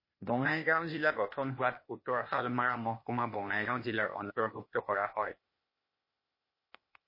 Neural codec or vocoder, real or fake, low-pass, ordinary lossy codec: codec, 16 kHz, 0.8 kbps, ZipCodec; fake; 5.4 kHz; MP3, 24 kbps